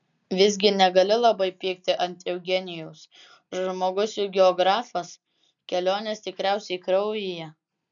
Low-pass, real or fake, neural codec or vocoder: 7.2 kHz; real; none